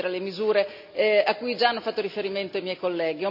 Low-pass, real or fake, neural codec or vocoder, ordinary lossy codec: 5.4 kHz; real; none; none